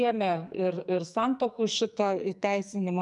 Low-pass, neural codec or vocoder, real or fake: 10.8 kHz; codec, 44.1 kHz, 2.6 kbps, SNAC; fake